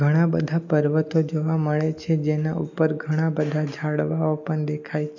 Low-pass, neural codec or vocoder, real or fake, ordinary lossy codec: 7.2 kHz; none; real; none